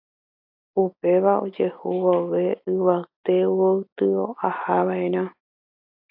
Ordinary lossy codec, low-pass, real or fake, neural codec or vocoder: AAC, 48 kbps; 5.4 kHz; real; none